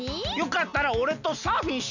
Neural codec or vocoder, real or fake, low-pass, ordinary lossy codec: none; real; 7.2 kHz; none